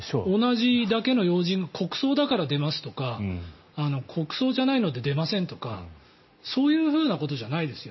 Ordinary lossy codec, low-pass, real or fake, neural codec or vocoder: MP3, 24 kbps; 7.2 kHz; real; none